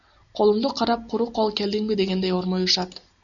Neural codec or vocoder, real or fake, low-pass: none; real; 7.2 kHz